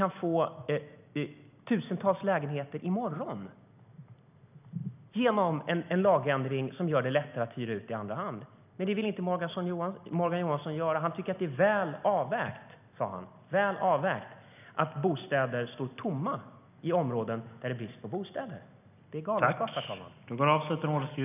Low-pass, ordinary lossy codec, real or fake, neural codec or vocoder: 3.6 kHz; none; real; none